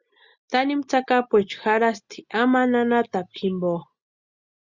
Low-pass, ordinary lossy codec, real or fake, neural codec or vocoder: 7.2 kHz; Opus, 64 kbps; real; none